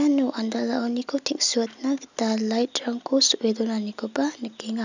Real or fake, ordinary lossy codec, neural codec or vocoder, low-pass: real; none; none; 7.2 kHz